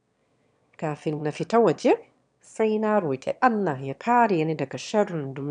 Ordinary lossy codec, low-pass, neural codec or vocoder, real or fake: none; 9.9 kHz; autoencoder, 22.05 kHz, a latent of 192 numbers a frame, VITS, trained on one speaker; fake